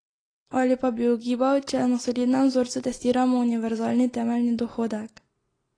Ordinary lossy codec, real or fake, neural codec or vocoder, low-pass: AAC, 32 kbps; real; none; 9.9 kHz